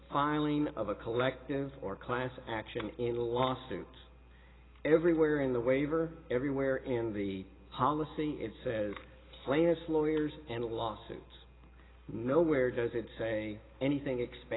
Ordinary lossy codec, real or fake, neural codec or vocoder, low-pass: AAC, 16 kbps; real; none; 7.2 kHz